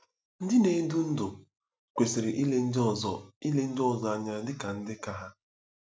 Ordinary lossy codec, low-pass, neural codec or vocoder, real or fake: none; none; none; real